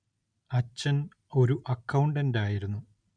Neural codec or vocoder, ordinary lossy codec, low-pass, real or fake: none; none; 9.9 kHz; real